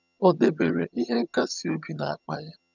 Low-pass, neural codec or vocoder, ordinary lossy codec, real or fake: 7.2 kHz; vocoder, 22.05 kHz, 80 mel bands, HiFi-GAN; none; fake